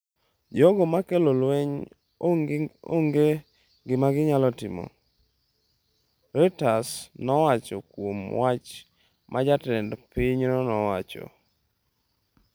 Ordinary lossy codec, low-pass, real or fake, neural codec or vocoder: none; none; real; none